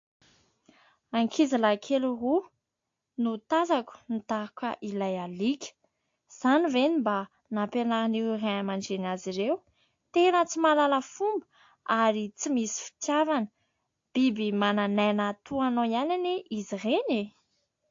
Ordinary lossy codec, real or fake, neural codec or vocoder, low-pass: AAC, 48 kbps; real; none; 7.2 kHz